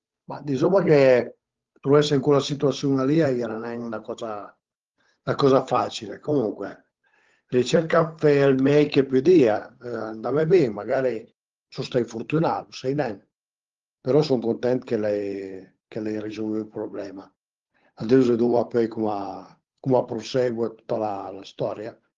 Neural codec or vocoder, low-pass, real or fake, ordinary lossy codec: codec, 16 kHz, 8 kbps, FunCodec, trained on Chinese and English, 25 frames a second; 7.2 kHz; fake; Opus, 32 kbps